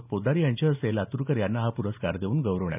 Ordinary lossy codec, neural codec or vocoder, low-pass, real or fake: none; none; 3.6 kHz; real